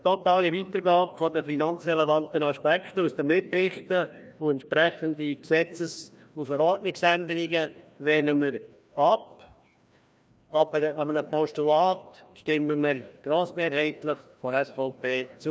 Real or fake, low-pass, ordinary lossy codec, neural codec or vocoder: fake; none; none; codec, 16 kHz, 1 kbps, FreqCodec, larger model